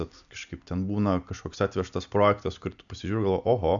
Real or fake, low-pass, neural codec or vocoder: real; 7.2 kHz; none